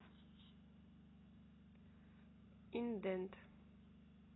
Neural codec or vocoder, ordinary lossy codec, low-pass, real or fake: none; AAC, 16 kbps; 7.2 kHz; real